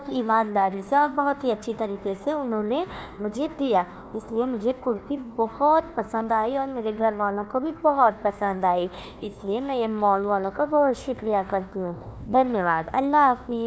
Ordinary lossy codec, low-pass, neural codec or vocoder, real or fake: none; none; codec, 16 kHz, 1 kbps, FunCodec, trained on Chinese and English, 50 frames a second; fake